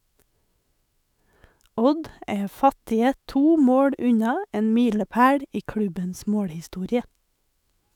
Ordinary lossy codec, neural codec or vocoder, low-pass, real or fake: none; autoencoder, 48 kHz, 128 numbers a frame, DAC-VAE, trained on Japanese speech; 19.8 kHz; fake